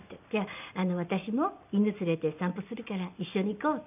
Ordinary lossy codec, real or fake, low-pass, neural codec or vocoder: none; real; 3.6 kHz; none